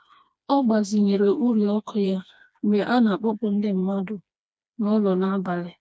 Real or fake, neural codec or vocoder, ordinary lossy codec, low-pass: fake; codec, 16 kHz, 2 kbps, FreqCodec, smaller model; none; none